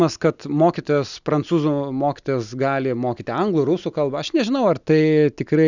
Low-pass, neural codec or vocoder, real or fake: 7.2 kHz; none; real